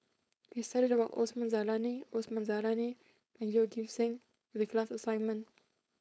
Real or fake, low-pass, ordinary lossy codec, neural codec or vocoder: fake; none; none; codec, 16 kHz, 4.8 kbps, FACodec